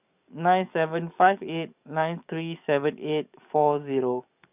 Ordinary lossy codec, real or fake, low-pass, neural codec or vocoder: none; fake; 3.6 kHz; codec, 44.1 kHz, 7.8 kbps, DAC